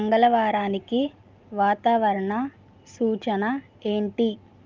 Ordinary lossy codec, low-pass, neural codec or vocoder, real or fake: Opus, 24 kbps; 7.2 kHz; none; real